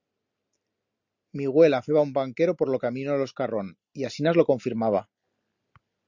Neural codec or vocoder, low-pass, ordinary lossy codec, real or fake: none; 7.2 kHz; Opus, 64 kbps; real